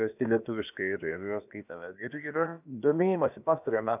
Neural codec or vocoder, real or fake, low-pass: codec, 16 kHz, about 1 kbps, DyCAST, with the encoder's durations; fake; 3.6 kHz